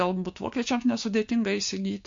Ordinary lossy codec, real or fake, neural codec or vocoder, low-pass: MP3, 48 kbps; fake; codec, 16 kHz, 6 kbps, DAC; 7.2 kHz